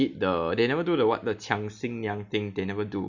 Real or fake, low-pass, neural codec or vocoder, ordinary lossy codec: real; 7.2 kHz; none; none